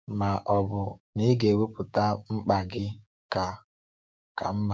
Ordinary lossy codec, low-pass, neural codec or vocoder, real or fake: none; none; codec, 16 kHz, 6 kbps, DAC; fake